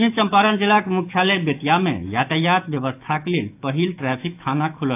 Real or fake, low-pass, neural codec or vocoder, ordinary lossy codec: fake; 3.6 kHz; autoencoder, 48 kHz, 128 numbers a frame, DAC-VAE, trained on Japanese speech; AAC, 32 kbps